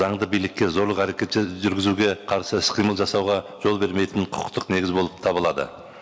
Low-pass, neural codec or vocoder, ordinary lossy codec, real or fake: none; none; none; real